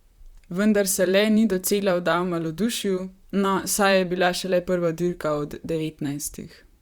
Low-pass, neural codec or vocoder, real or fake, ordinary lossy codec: 19.8 kHz; vocoder, 44.1 kHz, 128 mel bands, Pupu-Vocoder; fake; Opus, 64 kbps